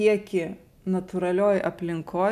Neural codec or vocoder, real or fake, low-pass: none; real; 14.4 kHz